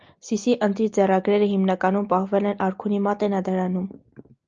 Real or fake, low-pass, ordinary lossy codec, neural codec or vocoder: real; 7.2 kHz; Opus, 24 kbps; none